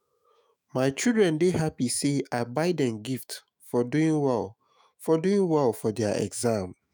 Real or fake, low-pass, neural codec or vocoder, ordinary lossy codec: fake; none; autoencoder, 48 kHz, 128 numbers a frame, DAC-VAE, trained on Japanese speech; none